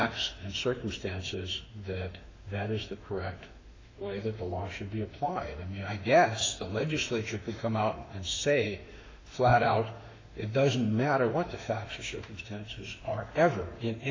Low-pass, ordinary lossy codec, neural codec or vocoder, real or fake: 7.2 kHz; AAC, 32 kbps; autoencoder, 48 kHz, 32 numbers a frame, DAC-VAE, trained on Japanese speech; fake